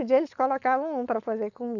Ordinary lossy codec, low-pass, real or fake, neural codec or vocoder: none; 7.2 kHz; fake; codec, 16 kHz, 4.8 kbps, FACodec